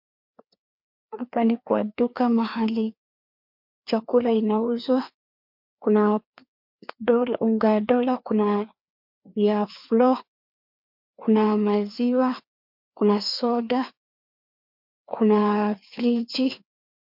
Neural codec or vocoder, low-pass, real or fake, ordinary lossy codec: codec, 16 kHz, 2 kbps, FreqCodec, larger model; 5.4 kHz; fake; MP3, 48 kbps